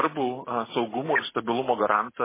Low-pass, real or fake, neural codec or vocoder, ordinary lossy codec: 3.6 kHz; real; none; MP3, 16 kbps